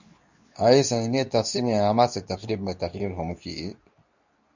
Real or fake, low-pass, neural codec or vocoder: fake; 7.2 kHz; codec, 24 kHz, 0.9 kbps, WavTokenizer, medium speech release version 2